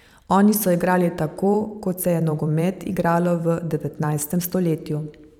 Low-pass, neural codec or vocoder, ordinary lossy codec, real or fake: 19.8 kHz; vocoder, 44.1 kHz, 128 mel bands every 512 samples, BigVGAN v2; none; fake